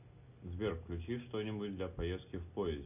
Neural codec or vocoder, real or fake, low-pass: none; real; 3.6 kHz